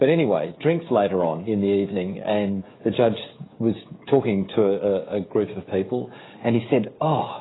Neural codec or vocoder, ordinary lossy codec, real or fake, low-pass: codec, 16 kHz, 16 kbps, FreqCodec, smaller model; AAC, 16 kbps; fake; 7.2 kHz